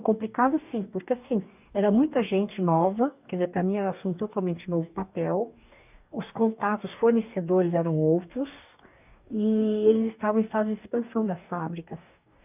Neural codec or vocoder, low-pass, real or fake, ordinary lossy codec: codec, 44.1 kHz, 2.6 kbps, DAC; 3.6 kHz; fake; none